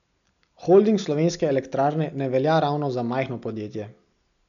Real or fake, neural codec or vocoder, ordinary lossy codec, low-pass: real; none; none; 7.2 kHz